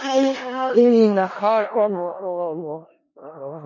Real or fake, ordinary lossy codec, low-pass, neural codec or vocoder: fake; MP3, 32 kbps; 7.2 kHz; codec, 16 kHz in and 24 kHz out, 0.4 kbps, LongCat-Audio-Codec, four codebook decoder